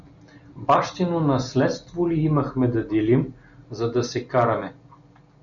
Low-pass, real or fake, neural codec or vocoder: 7.2 kHz; real; none